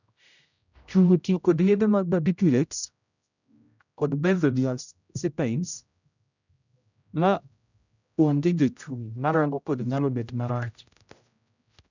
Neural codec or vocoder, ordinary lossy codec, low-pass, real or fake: codec, 16 kHz, 0.5 kbps, X-Codec, HuBERT features, trained on general audio; none; 7.2 kHz; fake